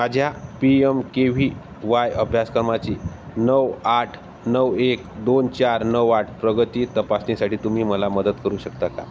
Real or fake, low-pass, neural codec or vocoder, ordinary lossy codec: real; none; none; none